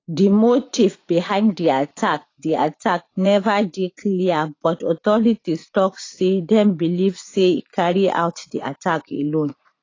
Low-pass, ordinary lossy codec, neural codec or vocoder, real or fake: 7.2 kHz; AAC, 32 kbps; vocoder, 44.1 kHz, 80 mel bands, Vocos; fake